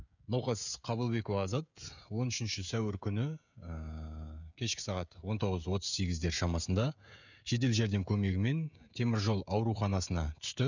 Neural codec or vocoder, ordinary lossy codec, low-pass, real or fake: codec, 16 kHz, 16 kbps, FreqCodec, smaller model; none; 7.2 kHz; fake